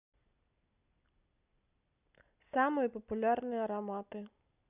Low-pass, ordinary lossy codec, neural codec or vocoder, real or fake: 3.6 kHz; none; none; real